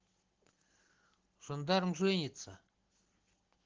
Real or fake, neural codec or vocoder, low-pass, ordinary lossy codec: real; none; 7.2 kHz; Opus, 16 kbps